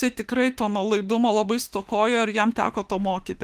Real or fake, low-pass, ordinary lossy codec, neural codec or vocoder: fake; 14.4 kHz; Opus, 24 kbps; autoencoder, 48 kHz, 32 numbers a frame, DAC-VAE, trained on Japanese speech